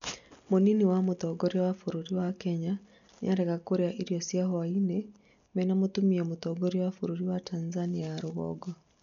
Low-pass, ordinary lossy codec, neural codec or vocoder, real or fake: 7.2 kHz; none; none; real